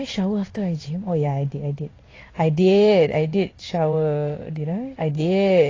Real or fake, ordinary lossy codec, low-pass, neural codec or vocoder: fake; AAC, 32 kbps; 7.2 kHz; codec, 16 kHz in and 24 kHz out, 1 kbps, XY-Tokenizer